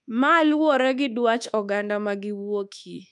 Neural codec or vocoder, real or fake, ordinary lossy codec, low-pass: codec, 24 kHz, 1.2 kbps, DualCodec; fake; none; 10.8 kHz